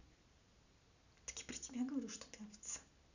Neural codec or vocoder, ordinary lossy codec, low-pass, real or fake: none; none; 7.2 kHz; real